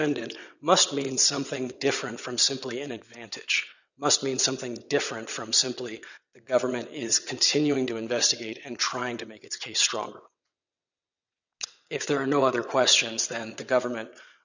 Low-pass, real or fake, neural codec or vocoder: 7.2 kHz; fake; vocoder, 22.05 kHz, 80 mel bands, WaveNeXt